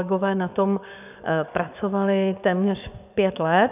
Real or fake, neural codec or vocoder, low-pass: fake; codec, 16 kHz, 6 kbps, DAC; 3.6 kHz